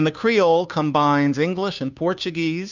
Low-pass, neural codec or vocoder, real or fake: 7.2 kHz; codec, 16 kHz, 2 kbps, FunCodec, trained on Chinese and English, 25 frames a second; fake